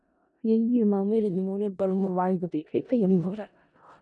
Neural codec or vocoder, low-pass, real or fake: codec, 16 kHz in and 24 kHz out, 0.4 kbps, LongCat-Audio-Codec, four codebook decoder; 10.8 kHz; fake